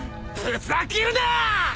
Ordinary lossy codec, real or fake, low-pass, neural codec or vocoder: none; real; none; none